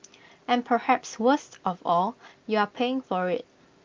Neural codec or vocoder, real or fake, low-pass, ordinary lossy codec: none; real; 7.2 kHz; Opus, 24 kbps